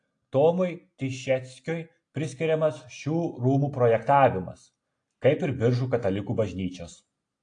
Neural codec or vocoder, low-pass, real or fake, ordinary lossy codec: none; 9.9 kHz; real; AAC, 48 kbps